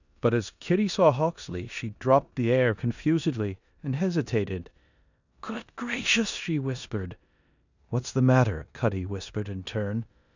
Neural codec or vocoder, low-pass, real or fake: codec, 16 kHz in and 24 kHz out, 0.9 kbps, LongCat-Audio-Codec, four codebook decoder; 7.2 kHz; fake